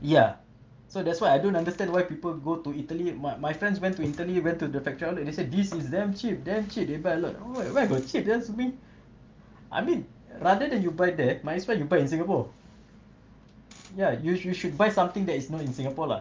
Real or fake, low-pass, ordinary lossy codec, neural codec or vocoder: real; 7.2 kHz; Opus, 32 kbps; none